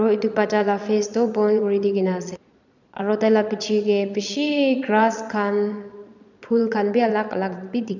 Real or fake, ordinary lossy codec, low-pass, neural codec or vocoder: fake; none; 7.2 kHz; vocoder, 22.05 kHz, 80 mel bands, Vocos